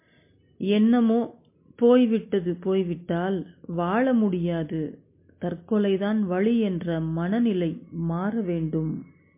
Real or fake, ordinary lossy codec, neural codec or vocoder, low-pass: real; MP3, 24 kbps; none; 3.6 kHz